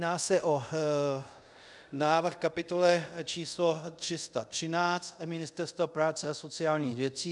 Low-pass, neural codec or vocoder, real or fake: 10.8 kHz; codec, 24 kHz, 0.5 kbps, DualCodec; fake